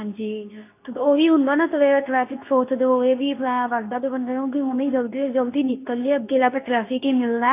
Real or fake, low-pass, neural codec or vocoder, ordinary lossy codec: fake; 3.6 kHz; codec, 24 kHz, 0.9 kbps, WavTokenizer, medium speech release version 2; AAC, 24 kbps